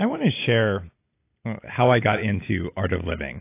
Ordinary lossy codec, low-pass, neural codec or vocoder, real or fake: AAC, 24 kbps; 3.6 kHz; none; real